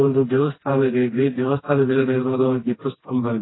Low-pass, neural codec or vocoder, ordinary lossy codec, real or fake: 7.2 kHz; codec, 16 kHz, 1 kbps, FreqCodec, smaller model; AAC, 16 kbps; fake